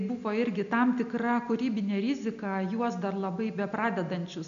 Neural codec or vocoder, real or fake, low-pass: none; real; 7.2 kHz